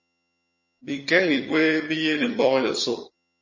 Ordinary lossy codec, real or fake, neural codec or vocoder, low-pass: MP3, 32 kbps; fake; vocoder, 22.05 kHz, 80 mel bands, HiFi-GAN; 7.2 kHz